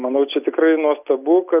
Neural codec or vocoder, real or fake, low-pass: none; real; 3.6 kHz